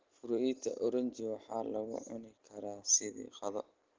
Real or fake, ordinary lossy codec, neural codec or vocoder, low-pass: real; Opus, 16 kbps; none; 7.2 kHz